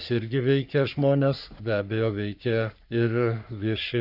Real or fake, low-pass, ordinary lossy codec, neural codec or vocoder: fake; 5.4 kHz; AAC, 48 kbps; codec, 44.1 kHz, 3.4 kbps, Pupu-Codec